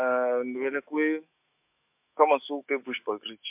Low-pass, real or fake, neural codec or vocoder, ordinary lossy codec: 3.6 kHz; fake; autoencoder, 48 kHz, 128 numbers a frame, DAC-VAE, trained on Japanese speech; MP3, 32 kbps